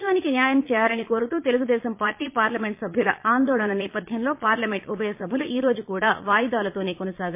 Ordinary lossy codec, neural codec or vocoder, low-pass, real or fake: MP3, 24 kbps; vocoder, 22.05 kHz, 80 mel bands, Vocos; 3.6 kHz; fake